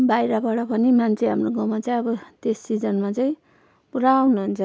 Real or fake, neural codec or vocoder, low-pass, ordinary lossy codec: real; none; none; none